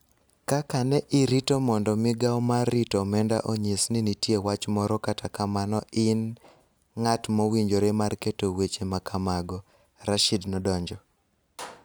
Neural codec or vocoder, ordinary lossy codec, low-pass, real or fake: none; none; none; real